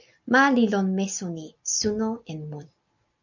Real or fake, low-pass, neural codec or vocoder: real; 7.2 kHz; none